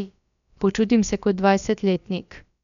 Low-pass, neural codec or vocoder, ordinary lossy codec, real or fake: 7.2 kHz; codec, 16 kHz, about 1 kbps, DyCAST, with the encoder's durations; none; fake